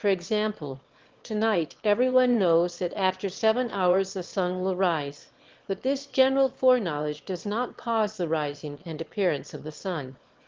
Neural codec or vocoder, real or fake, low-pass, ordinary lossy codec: autoencoder, 22.05 kHz, a latent of 192 numbers a frame, VITS, trained on one speaker; fake; 7.2 kHz; Opus, 16 kbps